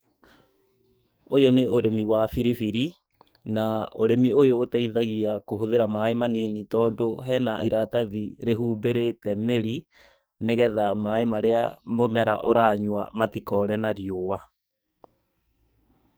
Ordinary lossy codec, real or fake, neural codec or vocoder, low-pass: none; fake; codec, 44.1 kHz, 2.6 kbps, SNAC; none